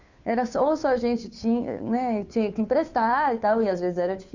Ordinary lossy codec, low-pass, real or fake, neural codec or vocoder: none; 7.2 kHz; fake; codec, 16 kHz, 2 kbps, FunCodec, trained on Chinese and English, 25 frames a second